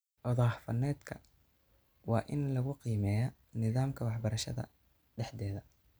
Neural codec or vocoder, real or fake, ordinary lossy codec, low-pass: none; real; none; none